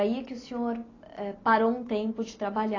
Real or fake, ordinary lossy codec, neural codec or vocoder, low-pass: real; AAC, 32 kbps; none; 7.2 kHz